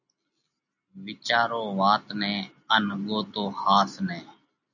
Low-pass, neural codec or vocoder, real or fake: 7.2 kHz; none; real